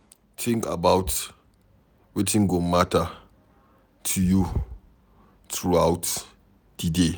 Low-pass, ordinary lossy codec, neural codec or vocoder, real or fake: none; none; none; real